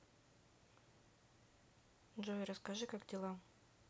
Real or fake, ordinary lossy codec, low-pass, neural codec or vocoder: real; none; none; none